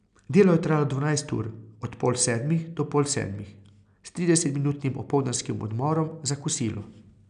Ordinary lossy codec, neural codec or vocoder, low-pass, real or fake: none; none; 9.9 kHz; real